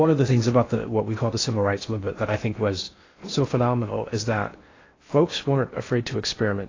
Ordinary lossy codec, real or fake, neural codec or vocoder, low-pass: AAC, 32 kbps; fake; codec, 16 kHz in and 24 kHz out, 0.6 kbps, FocalCodec, streaming, 4096 codes; 7.2 kHz